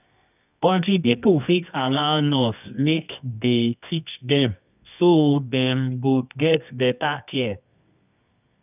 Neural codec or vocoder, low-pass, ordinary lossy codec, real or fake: codec, 24 kHz, 0.9 kbps, WavTokenizer, medium music audio release; 3.6 kHz; none; fake